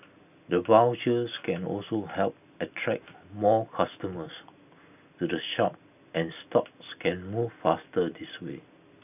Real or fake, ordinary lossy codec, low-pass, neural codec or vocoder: real; none; 3.6 kHz; none